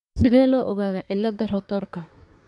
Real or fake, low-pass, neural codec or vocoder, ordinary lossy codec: fake; 10.8 kHz; codec, 24 kHz, 1 kbps, SNAC; none